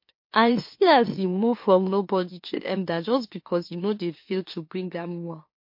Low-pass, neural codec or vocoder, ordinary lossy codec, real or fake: 5.4 kHz; autoencoder, 44.1 kHz, a latent of 192 numbers a frame, MeloTTS; MP3, 32 kbps; fake